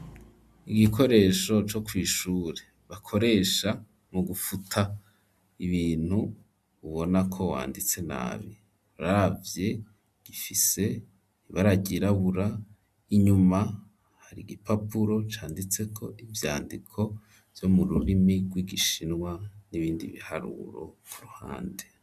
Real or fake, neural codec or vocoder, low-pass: real; none; 14.4 kHz